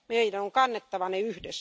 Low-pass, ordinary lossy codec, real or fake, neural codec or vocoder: none; none; real; none